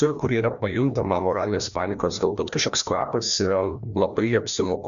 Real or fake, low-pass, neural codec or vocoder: fake; 7.2 kHz; codec, 16 kHz, 1 kbps, FreqCodec, larger model